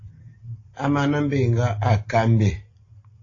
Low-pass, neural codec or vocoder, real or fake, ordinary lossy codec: 7.2 kHz; none; real; AAC, 32 kbps